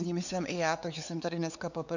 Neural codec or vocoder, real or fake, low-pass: codec, 16 kHz, 4 kbps, X-Codec, WavLM features, trained on Multilingual LibriSpeech; fake; 7.2 kHz